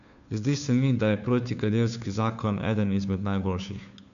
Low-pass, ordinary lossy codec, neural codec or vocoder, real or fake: 7.2 kHz; none; codec, 16 kHz, 2 kbps, FunCodec, trained on Chinese and English, 25 frames a second; fake